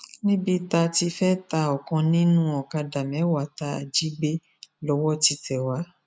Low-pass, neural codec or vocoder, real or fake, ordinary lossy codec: none; none; real; none